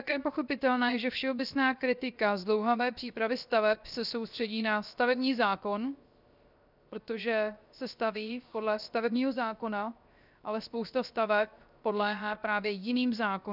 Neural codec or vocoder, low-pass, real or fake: codec, 16 kHz, 0.7 kbps, FocalCodec; 5.4 kHz; fake